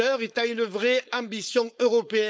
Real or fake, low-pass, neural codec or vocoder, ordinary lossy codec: fake; none; codec, 16 kHz, 4.8 kbps, FACodec; none